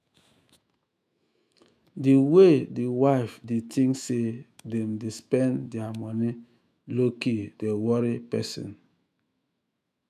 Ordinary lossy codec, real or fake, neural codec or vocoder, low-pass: none; fake; autoencoder, 48 kHz, 128 numbers a frame, DAC-VAE, trained on Japanese speech; 14.4 kHz